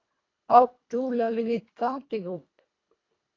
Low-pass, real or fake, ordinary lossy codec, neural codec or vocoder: 7.2 kHz; fake; AAC, 32 kbps; codec, 24 kHz, 1.5 kbps, HILCodec